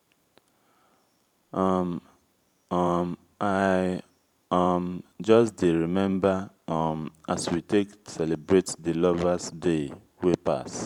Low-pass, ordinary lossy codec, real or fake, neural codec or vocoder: 19.8 kHz; none; fake; vocoder, 44.1 kHz, 128 mel bands every 512 samples, BigVGAN v2